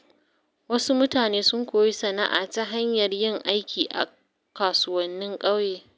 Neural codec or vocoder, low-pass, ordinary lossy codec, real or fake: none; none; none; real